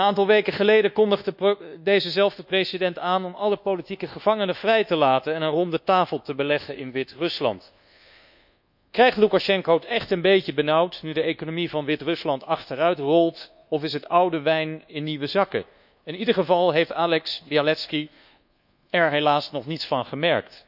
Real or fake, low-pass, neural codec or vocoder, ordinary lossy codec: fake; 5.4 kHz; codec, 24 kHz, 1.2 kbps, DualCodec; none